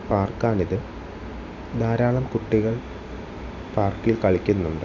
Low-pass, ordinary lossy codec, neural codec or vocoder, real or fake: 7.2 kHz; none; none; real